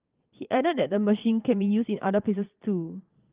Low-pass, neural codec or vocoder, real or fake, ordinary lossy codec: 3.6 kHz; vocoder, 22.05 kHz, 80 mel bands, Vocos; fake; Opus, 32 kbps